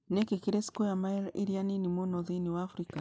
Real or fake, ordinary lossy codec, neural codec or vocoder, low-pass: real; none; none; none